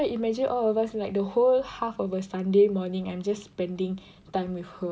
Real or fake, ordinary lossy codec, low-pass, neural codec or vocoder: real; none; none; none